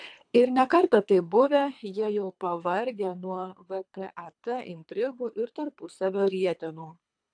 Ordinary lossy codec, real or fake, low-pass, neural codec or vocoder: AAC, 64 kbps; fake; 9.9 kHz; codec, 24 kHz, 3 kbps, HILCodec